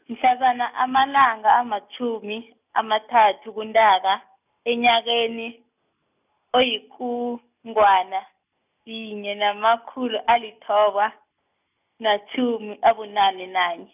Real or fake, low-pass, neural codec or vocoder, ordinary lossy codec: real; 3.6 kHz; none; none